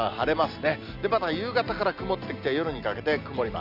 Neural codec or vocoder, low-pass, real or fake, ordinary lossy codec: none; 5.4 kHz; real; none